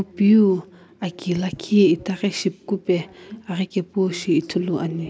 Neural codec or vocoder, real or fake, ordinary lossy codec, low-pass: none; real; none; none